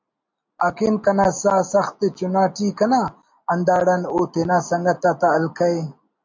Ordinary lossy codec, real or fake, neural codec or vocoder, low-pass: MP3, 48 kbps; real; none; 7.2 kHz